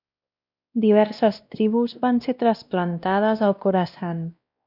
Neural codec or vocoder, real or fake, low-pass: codec, 16 kHz, 1 kbps, X-Codec, WavLM features, trained on Multilingual LibriSpeech; fake; 5.4 kHz